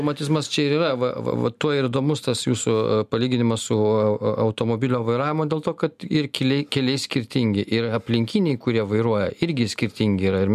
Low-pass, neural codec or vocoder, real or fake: 14.4 kHz; none; real